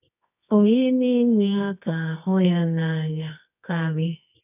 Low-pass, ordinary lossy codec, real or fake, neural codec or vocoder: 3.6 kHz; none; fake; codec, 24 kHz, 0.9 kbps, WavTokenizer, medium music audio release